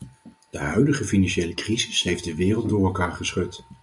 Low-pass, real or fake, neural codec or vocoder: 10.8 kHz; real; none